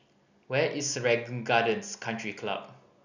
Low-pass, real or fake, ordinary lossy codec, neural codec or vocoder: 7.2 kHz; real; none; none